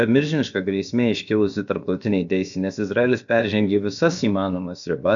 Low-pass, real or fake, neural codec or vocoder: 7.2 kHz; fake; codec, 16 kHz, about 1 kbps, DyCAST, with the encoder's durations